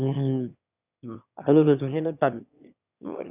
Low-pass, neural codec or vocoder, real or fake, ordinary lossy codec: 3.6 kHz; autoencoder, 22.05 kHz, a latent of 192 numbers a frame, VITS, trained on one speaker; fake; none